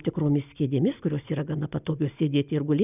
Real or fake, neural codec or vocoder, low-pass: real; none; 3.6 kHz